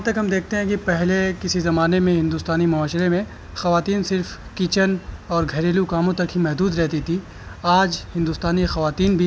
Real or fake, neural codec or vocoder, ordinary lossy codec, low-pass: real; none; none; none